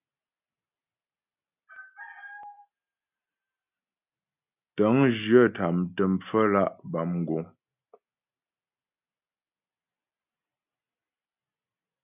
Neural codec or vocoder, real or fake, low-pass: none; real; 3.6 kHz